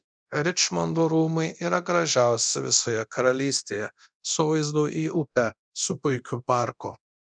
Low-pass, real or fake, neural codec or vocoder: 9.9 kHz; fake; codec, 24 kHz, 0.9 kbps, DualCodec